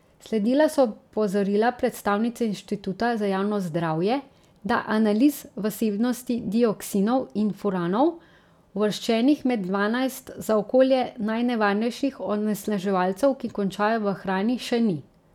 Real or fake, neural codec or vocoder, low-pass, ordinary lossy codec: real; none; 19.8 kHz; none